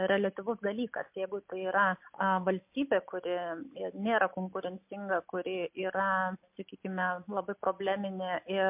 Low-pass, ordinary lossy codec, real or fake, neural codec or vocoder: 3.6 kHz; MP3, 32 kbps; real; none